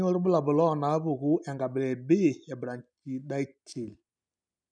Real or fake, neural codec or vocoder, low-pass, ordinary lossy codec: real; none; 9.9 kHz; none